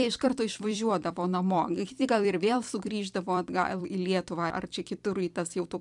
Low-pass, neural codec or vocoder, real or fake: 10.8 kHz; vocoder, 44.1 kHz, 128 mel bands every 256 samples, BigVGAN v2; fake